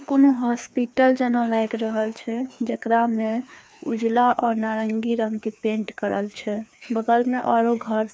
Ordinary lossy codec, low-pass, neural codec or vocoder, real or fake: none; none; codec, 16 kHz, 2 kbps, FreqCodec, larger model; fake